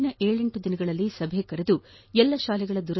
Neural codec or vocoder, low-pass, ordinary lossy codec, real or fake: none; 7.2 kHz; MP3, 24 kbps; real